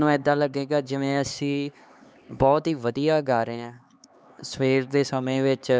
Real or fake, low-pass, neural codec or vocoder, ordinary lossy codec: fake; none; codec, 16 kHz, 4 kbps, X-Codec, HuBERT features, trained on LibriSpeech; none